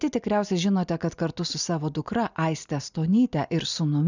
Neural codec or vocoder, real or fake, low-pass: none; real; 7.2 kHz